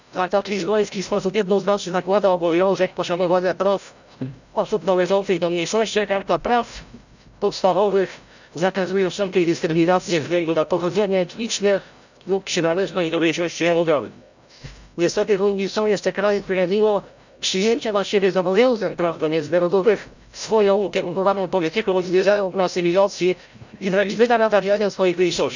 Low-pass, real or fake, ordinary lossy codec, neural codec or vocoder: 7.2 kHz; fake; none; codec, 16 kHz, 0.5 kbps, FreqCodec, larger model